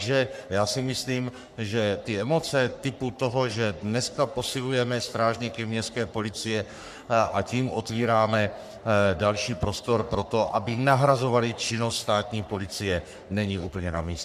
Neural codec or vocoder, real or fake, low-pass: codec, 44.1 kHz, 3.4 kbps, Pupu-Codec; fake; 14.4 kHz